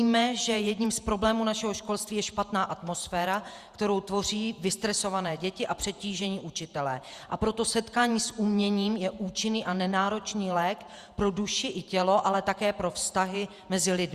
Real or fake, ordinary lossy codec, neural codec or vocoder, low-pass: fake; Opus, 64 kbps; vocoder, 48 kHz, 128 mel bands, Vocos; 14.4 kHz